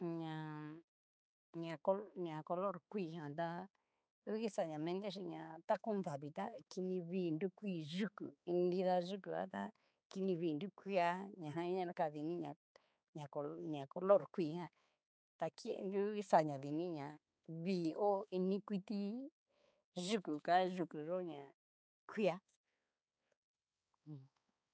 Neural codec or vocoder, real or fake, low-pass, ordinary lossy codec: codec, 16 kHz, 4 kbps, X-Codec, HuBERT features, trained on balanced general audio; fake; none; none